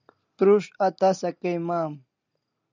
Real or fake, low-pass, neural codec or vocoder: real; 7.2 kHz; none